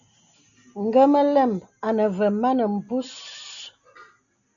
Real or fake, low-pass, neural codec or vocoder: real; 7.2 kHz; none